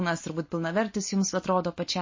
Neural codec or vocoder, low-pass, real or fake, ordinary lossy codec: none; 7.2 kHz; real; MP3, 32 kbps